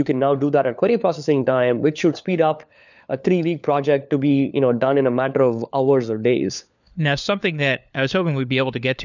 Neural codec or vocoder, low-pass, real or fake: codec, 16 kHz, 4 kbps, FunCodec, trained on LibriTTS, 50 frames a second; 7.2 kHz; fake